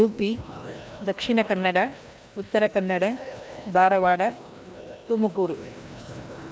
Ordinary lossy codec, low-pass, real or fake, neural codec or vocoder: none; none; fake; codec, 16 kHz, 1 kbps, FreqCodec, larger model